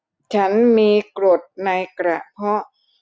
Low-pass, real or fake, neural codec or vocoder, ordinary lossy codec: none; real; none; none